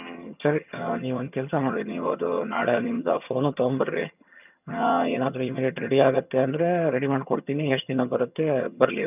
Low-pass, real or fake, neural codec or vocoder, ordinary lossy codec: 3.6 kHz; fake; vocoder, 22.05 kHz, 80 mel bands, HiFi-GAN; none